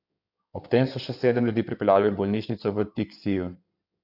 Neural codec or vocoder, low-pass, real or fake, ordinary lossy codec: codec, 16 kHz in and 24 kHz out, 2.2 kbps, FireRedTTS-2 codec; 5.4 kHz; fake; none